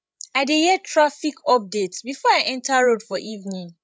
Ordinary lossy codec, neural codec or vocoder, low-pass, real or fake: none; codec, 16 kHz, 16 kbps, FreqCodec, larger model; none; fake